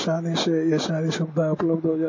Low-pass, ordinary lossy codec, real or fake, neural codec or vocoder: 7.2 kHz; MP3, 32 kbps; real; none